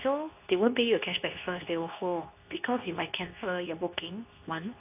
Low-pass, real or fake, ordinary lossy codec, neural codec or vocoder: 3.6 kHz; fake; AAC, 32 kbps; codec, 24 kHz, 0.9 kbps, WavTokenizer, medium speech release version 2